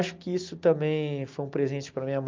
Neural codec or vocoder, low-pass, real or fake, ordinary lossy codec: none; 7.2 kHz; real; Opus, 32 kbps